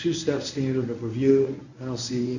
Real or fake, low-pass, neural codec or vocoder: fake; 7.2 kHz; codec, 24 kHz, 0.9 kbps, WavTokenizer, medium speech release version 1